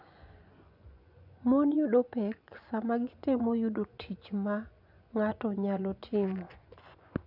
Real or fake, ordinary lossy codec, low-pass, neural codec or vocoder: fake; none; 5.4 kHz; vocoder, 24 kHz, 100 mel bands, Vocos